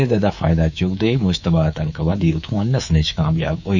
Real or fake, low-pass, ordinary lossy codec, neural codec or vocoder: fake; 7.2 kHz; none; codec, 24 kHz, 3.1 kbps, DualCodec